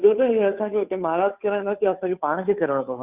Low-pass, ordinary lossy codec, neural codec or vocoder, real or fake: 3.6 kHz; Opus, 64 kbps; codec, 16 kHz, 2 kbps, FunCodec, trained on Chinese and English, 25 frames a second; fake